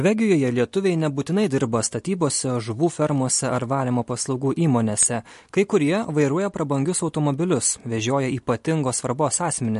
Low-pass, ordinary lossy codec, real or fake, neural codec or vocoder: 14.4 kHz; MP3, 48 kbps; real; none